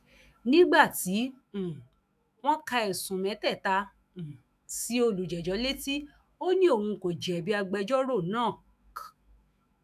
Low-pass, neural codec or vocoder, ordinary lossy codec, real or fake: 14.4 kHz; autoencoder, 48 kHz, 128 numbers a frame, DAC-VAE, trained on Japanese speech; none; fake